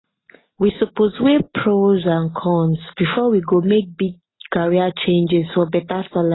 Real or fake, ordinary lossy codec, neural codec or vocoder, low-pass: real; AAC, 16 kbps; none; 7.2 kHz